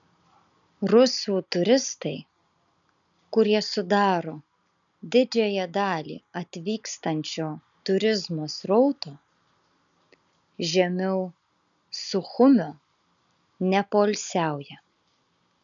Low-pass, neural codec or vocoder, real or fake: 7.2 kHz; none; real